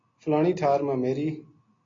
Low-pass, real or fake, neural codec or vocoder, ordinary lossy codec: 7.2 kHz; real; none; AAC, 32 kbps